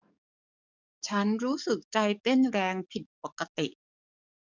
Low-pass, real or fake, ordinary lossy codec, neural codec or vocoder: 7.2 kHz; fake; none; codec, 44.1 kHz, 7.8 kbps, DAC